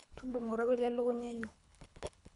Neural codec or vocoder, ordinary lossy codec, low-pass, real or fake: codec, 24 kHz, 3 kbps, HILCodec; none; 10.8 kHz; fake